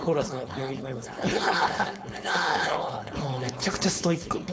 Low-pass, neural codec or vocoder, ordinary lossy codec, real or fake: none; codec, 16 kHz, 4.8 kbps, FACodec; none; fake